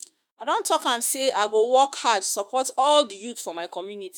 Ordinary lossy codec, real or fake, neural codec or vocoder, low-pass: none; fake; autoencoder, 48 kHz, 32 numbers a frame, DAC-VAE, trained on Japanese speech; none